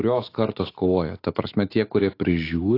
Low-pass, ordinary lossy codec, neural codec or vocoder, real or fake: 5.4 kHz; AAC, 32 kbps; autoencoder, 48 kHz, 128 numbers a frame, DAC-VAE, trained on Japanese speech; fake